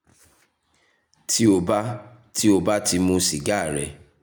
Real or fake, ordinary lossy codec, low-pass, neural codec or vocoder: real; none; none; none